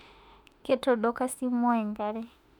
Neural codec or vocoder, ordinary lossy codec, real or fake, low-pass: autoencoder, 48 kHz, 32 numbers a frame, DAC-VAE, trained on Japanese speech; none; fake; 19.8 kHz